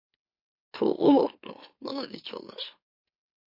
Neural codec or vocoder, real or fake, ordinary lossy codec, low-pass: autoencoder, 44.1 kHz, a latent of 192 numbers a frame, MeloTTS; fake; MP3, 32 kbps; 5.4 kHz